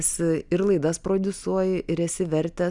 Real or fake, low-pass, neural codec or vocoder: real; 10.8 kHz; none